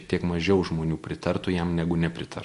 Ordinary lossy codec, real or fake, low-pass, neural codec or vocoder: MP3, 48 kbps; real; 14.4 kHz; none